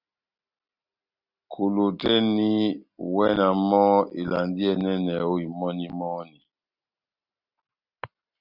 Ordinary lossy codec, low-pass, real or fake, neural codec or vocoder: Opus, 64 kbps; 5.4 kHz; real; none